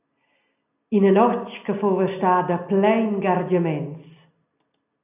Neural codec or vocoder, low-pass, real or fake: none; 3.6 kHz; real